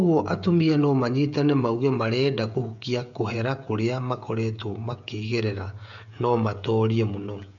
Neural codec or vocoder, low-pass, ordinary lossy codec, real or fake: codec, 16 kHz, 16 kbps, FreqCodec, smaller model; 7.2 kHz; none; fake